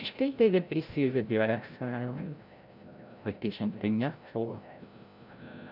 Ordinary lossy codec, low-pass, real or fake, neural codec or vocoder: none; 5.4 kHz; fake; codec, 16 kHz, 0.5 kbps, FreqCodec, larger model